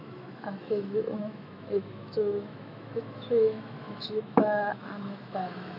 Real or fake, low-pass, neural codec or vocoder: fake; 5.4 kHz; autoencoder, 48 kHz, 128 numbers a frame, DAC-VAE, trained on Japanese speech